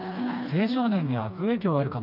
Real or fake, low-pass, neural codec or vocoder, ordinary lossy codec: fake; 5.4 kHz; codec, 16 kHz, 2 kbps, FreqCodec, smaller model; none